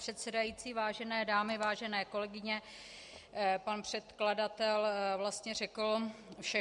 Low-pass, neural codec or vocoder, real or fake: 10.8 kHz; none; real